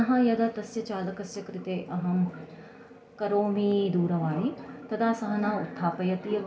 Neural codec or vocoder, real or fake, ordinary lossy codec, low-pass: none; real; none; none